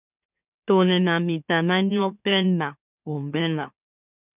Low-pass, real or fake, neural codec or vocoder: 3.6 kHz; fake; autoencoder, 44.1 kHz, a latent of 192 numbers a frame, MeloTTS